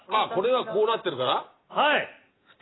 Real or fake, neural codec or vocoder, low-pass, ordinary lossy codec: real; none; 7.2 kHz; AAC, 16 kbps